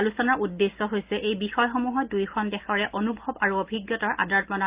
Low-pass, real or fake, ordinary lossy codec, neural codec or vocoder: 3.6 kHz; real; Opus, 32 kbps; none